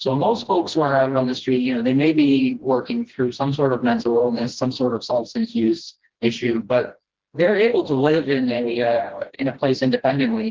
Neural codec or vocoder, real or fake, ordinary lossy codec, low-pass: codec, 16 kHz, 1 kbps, FreqCodec, smaller model; fake; Opus, 16 kbps; 7.2 kHz